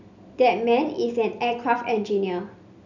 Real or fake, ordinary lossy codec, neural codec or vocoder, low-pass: real; none; none; 7.2 kHz